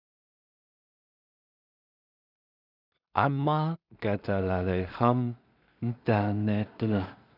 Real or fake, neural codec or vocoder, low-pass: fake; codec, 16 kHz in and 24 kHz out, 0.4 kbps, LongCat-Audio-Codec, two codebook decoder; 5.4 kHz